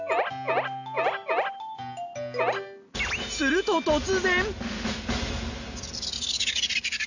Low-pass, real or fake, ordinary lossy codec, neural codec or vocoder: 7.2 kHz; real; none; none